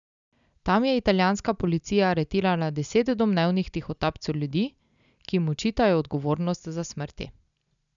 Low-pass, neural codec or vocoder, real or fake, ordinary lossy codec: 7.2 kHz; none; real; none